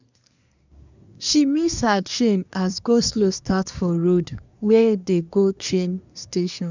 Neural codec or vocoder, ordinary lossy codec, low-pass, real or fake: codec, 24 kHz, 1 kbps, SNAC; none; 7.2 kHz; fake